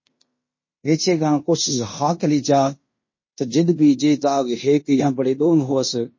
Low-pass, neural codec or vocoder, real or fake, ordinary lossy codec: 7.2 kHz; codec, 16 kHz in and 24 kHz out, 0.9 kbps, LongCat-Audio-Codec, fine tuned four codebook decoder; fake; MP3, 32 kbps